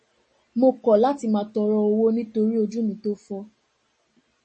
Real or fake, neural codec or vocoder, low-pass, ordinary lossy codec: real; none; 10.8 kHz; MP3, 32 kbps